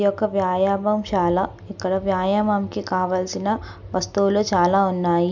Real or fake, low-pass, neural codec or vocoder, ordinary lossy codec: real; 7.2 kHz; none; none